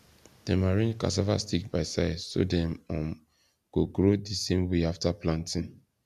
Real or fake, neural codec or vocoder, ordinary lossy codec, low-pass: real; none; none; 14.4 kHz